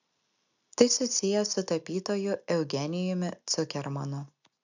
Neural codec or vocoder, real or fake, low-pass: none; real; 7.2 kHz